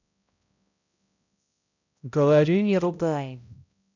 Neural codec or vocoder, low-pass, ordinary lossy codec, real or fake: codec, 16 kHz, 0.5 kbps, X-Codec, HuBERT features, trained on balanced general audio; 7.2 kHz; none; fake